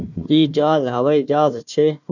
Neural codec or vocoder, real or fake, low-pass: codec, 16 kHz, 1 kbps, FunCodec, trained on Chinese and English, 50 frames a second; fake; 7.2 kHz